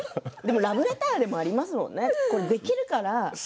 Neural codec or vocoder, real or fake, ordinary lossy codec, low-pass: none; real; none; none